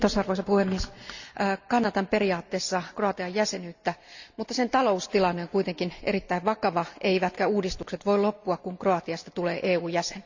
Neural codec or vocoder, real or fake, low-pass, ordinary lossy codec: none; real; 7.2 kHz; Opus, 64 kbps